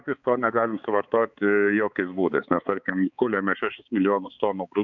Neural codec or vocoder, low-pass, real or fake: codec, 16 kHz, 4 kbps, X-Codec, HuBERT features, trained on balanced general audio; 7.2 kHz; fake